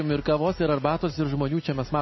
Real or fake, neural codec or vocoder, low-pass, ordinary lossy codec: real; none; 7.2 kHz; MP3, 24 kbps